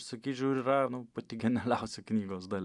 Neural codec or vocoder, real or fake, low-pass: none; real; 10.8 kHz